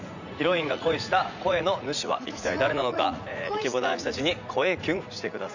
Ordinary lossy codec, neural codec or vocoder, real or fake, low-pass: none; vocoder, 44.1 kHz, 80 mel bands, Vocos; fake; 7.2 kHz